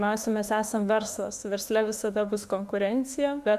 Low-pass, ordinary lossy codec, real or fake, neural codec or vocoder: 14.4 kHz; Opus, 64 kbps; fake; autoencoder, 48 kHz, 32 numbers a frame, DAC-VAE, trained on Japanese speech